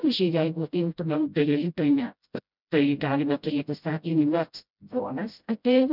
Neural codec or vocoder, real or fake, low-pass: codec, 16 kHz, 0.5 kbps, FreqCodec, smaller model; fake; 5.4 kHz